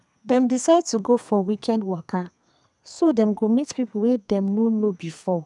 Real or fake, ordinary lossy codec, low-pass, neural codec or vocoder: fake; none; 10.8 kHz; codec, 32 kHz, 1.9 kbps, SNAC